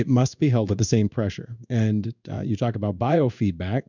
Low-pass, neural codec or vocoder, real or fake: 7.2 kHz; codec, 16 kHz in and 24 kHz out, 1 kbps, XY-Tokenizer; fake